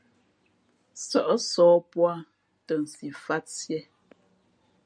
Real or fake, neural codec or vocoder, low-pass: real; none; 9.9 kHz